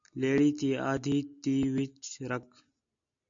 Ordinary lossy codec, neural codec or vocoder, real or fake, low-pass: AAC, 64 kbps; none; real; 7.2 kHz